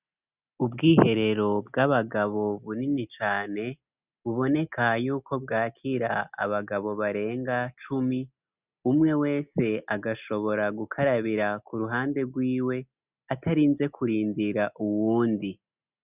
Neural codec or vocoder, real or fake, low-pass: none; real; 3.6 kHz